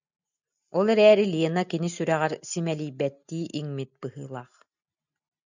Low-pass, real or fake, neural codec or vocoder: 7.2 kHz; real; none